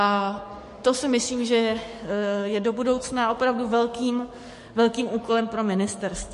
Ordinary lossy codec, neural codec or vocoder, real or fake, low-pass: MP3, 48 kbps; codec, 44.1 kHz, 7.8 kbps, DAC; fake; 14.4 kHz